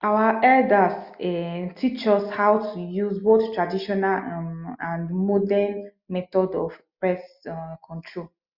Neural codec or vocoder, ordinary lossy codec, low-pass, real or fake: none; Opus, 64 kbps; 5.4 kHz; real